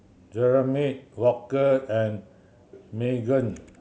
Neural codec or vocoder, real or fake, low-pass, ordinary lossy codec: none; real; none; none